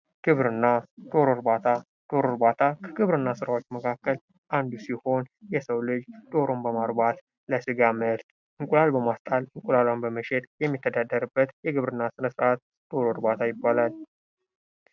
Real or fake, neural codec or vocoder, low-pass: real; none; 7.2 kHz